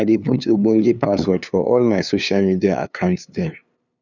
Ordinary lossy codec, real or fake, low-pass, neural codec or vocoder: none; fake; 7.2 kHz; codec, 16 kHz, 2 kbps, FunCodec, trained on LibriTTS, 25 frames a second